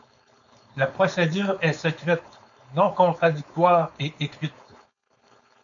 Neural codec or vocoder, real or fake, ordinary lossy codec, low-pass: codec, 16 kHz, 4.8 kbps, FACodec; fake; MP3, 96 kbps; 7.2 kHz